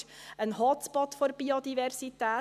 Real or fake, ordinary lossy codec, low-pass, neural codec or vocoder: real; none; 14.4 kHz; none